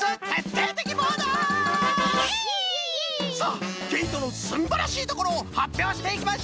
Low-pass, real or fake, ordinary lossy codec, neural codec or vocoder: none; real; none; none